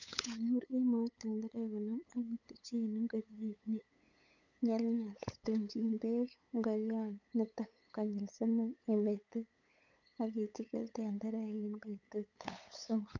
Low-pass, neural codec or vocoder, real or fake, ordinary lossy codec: 7.2 kHz; codec, 16 kHz, 8 kbps, FunCodec, trained on LibriTTS, 25 frames a second; fake; none